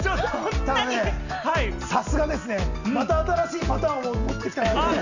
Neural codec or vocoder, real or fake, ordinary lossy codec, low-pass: none; real; none; 7.2 kHz